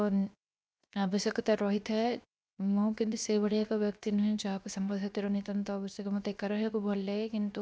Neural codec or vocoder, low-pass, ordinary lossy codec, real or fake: codec, 16 kHz, 0.7 kbps, FocalCodec; none; none; fake